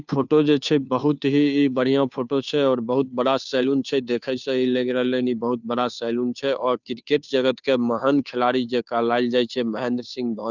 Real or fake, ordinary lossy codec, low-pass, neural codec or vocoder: fake; none; 7.2 kHz; codec, 16 kHz, 2 kbps, FunCodec, trained on Chinese and English, 25 frames a second